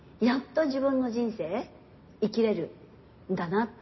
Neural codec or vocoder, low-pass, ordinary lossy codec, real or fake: none; 7.2 kHz; MP3, 24 kbps; real